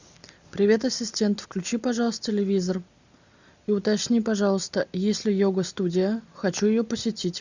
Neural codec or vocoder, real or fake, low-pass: none; real; 7.2 kHz